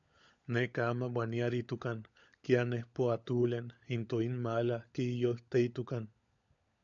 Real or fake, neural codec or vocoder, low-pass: fake; codec, 16 kHz, 16 kbps, FunCodec, trained on LibriTTS, 50 frames a second; 7.2 kHz